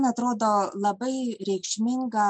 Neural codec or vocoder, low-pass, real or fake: none; 9.9 kHz; real